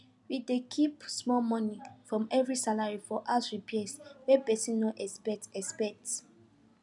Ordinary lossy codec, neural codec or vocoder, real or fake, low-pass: none; none; real; 10.8 kHz